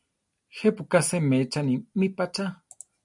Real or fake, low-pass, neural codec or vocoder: real; 10.8 kHz; none